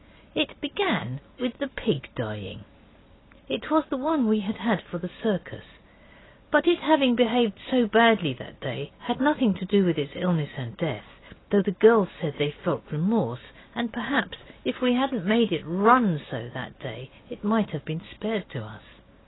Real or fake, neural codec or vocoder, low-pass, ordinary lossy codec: real; none; 7.2 kHz; AAC, 16 kbps